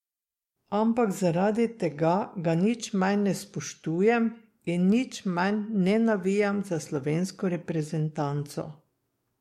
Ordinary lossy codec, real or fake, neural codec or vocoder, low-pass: MP3, 64 kbps; fake; codec, 44.1 kHz, 7.8 kbps, DAC; 19.8 kHz